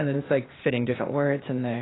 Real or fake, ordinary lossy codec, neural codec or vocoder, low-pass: fake; AAC, 16 kbps; codec, 16 kHz, 0.8 kbps, ZipCodec; 7.2 kHz